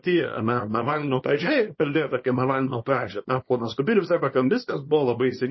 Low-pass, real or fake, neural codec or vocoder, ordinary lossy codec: 7.2 kHz; fake; codec, 24 kHz, 0.9 kbps, WavTokenizer, small release; MP3, 24 kbps